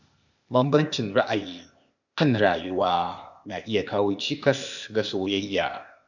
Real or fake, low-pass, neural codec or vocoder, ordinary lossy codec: fake; 7.2 kHz; codec, 16 kHz, 0.8 kbps, ZipCodec; none